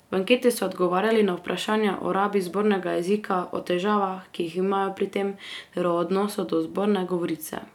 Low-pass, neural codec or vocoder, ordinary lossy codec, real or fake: 19.8 kHz; none; none; real